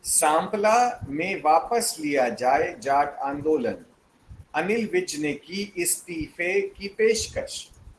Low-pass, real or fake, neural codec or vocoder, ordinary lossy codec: 10.8 kHz; real; none; Opus, 16 kbps